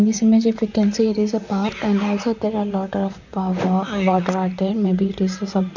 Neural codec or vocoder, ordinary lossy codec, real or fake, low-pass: vocoder, 44.1 kHz, 128 mel bands, Pupu-Vocoder; none; fake; 7.2 kHz